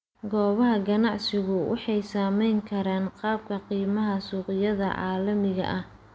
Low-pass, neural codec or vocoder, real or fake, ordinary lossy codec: none; none; real; none